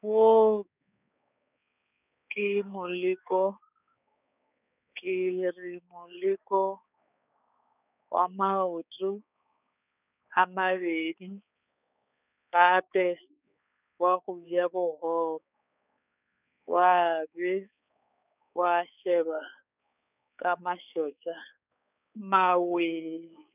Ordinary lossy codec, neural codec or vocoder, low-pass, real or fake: none; codec, 16 kHz, 4 kbps, X-Codec, HuBERT features, trained on balanced general audio; 3.6 kHz; fake